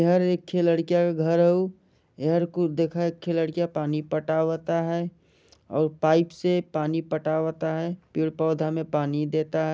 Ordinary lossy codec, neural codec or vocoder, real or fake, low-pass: none; none; real; none